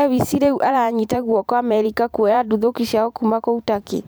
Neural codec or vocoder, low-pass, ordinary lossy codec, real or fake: vocoder, 44.1 kHz, 128 mel bands every 512 samples, BigVGAN v2; none; none; fake